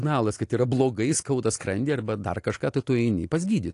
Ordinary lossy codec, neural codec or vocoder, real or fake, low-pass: AAC, 48 kbps; none; real; 10.8 kHz